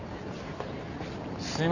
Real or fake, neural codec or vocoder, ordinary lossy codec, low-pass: real; none; none; 7.2 kHz